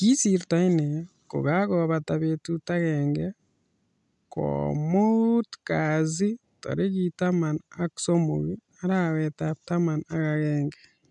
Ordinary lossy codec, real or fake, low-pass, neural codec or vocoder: none; real; 10.8 kHz; none